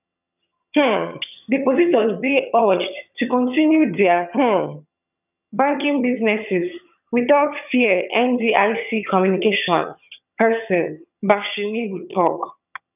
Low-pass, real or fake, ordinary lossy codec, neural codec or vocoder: 3.6 kHz; fake; none; vocoder, 22.05 kHz, 80 mel bands, HiFi-GAN